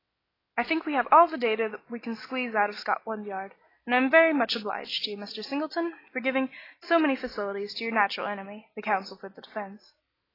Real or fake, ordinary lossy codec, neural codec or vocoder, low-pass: real; AAC, 24 kbps; none; 5.4 kHz